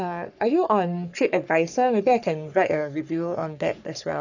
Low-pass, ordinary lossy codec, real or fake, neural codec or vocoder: 7.2 kHz; none; fake; codec, 44.1 kHz, 3.4 kbps, Pupu-Codec